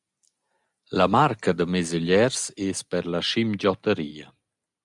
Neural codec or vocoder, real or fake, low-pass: none; real; 10.8 kHz